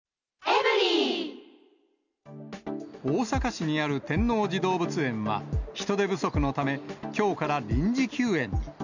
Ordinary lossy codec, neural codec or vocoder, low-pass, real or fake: none; none; 7.2 kHz; real